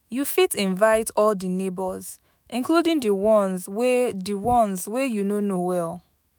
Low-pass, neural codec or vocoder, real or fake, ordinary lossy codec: none; autoencoder, 48 kHz, 128 numbers a frame, DAC-VAE, trained on Japanese speech; fake; none